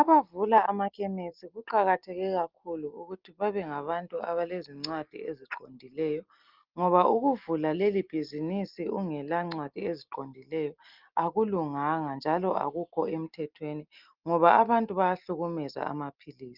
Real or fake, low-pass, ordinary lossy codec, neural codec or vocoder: real; 5.4 kHz; Opus, 32 kbps; none